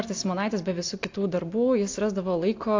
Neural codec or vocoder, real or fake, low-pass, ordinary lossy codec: none; real; 7.2 kHz; AAC, 48 kbps